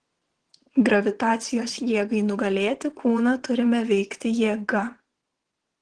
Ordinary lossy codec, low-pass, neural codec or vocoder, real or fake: Opus, 16 kbps; 9.9 kHz; vocoder, 22.05 kHz, 80 mel bands, WaveNeXt; fake